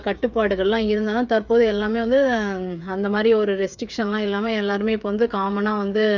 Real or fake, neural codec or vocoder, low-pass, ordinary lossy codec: fake; codec, 16 kHz, 8 kbps, FreqCodec, smaller model; 7.2 kHz; none